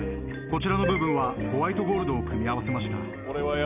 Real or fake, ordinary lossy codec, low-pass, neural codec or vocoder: real; none; 3.6 kHz; none